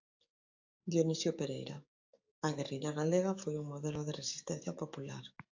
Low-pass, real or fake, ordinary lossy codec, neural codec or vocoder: 7.2 kHz; fake; AAC, 48 kbps; codec, 44.1 kHz, 7.8 kbps, DAC